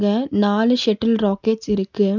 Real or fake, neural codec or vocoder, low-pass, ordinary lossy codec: real; none; 7.2 kHz; none